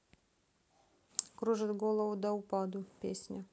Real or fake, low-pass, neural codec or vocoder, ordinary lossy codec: real; none; none; none